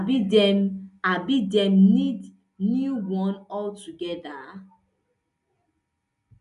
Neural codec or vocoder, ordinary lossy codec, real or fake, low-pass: none; none; real; 10.8 kHz